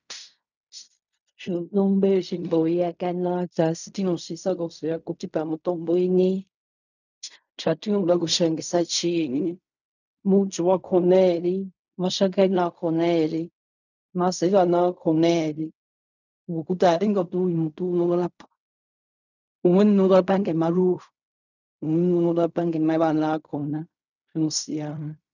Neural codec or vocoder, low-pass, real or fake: codec, 16 kHz in and 24 kHz out, 0.4 kbps, LongCat-Audio-Codec, fine tuned four codebook decoder; 7.2 kHz; fake